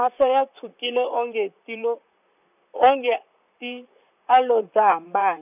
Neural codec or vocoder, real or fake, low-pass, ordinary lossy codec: vocoder, 44.1 kHz, 128 mel bands, Pupu-Vocoder; fake; 3.6 kHz; none